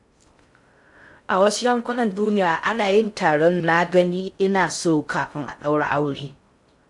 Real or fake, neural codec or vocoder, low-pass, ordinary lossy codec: fake; codec, 16 kHz in and 24 kHz out, 0.6 kbps, FocalCodec, streaming, 2048 codes; 10.8 kHz; AAC, 64 kbps